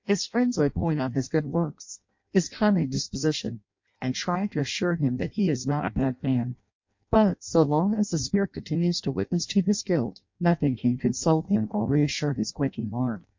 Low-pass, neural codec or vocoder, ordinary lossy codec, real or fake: 7.2 kHz; codec, 16 kHz in and 24 kHz out, 0.6 kbps, FireRedTTS-2 codec; MP3, 48 kbps; fake